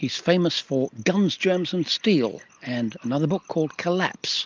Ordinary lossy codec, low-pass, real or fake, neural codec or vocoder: Opus, 24 kbps; 7.2 kHz; real; none